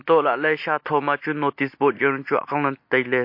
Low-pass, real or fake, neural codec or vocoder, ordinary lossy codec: 5.4 kHz; real; none; MP3, 32 kbps